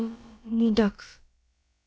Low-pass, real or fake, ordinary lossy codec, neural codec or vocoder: none; fake; none; codec, 16 kHz, about 1 kbps, DyCAST, with the encoder's durations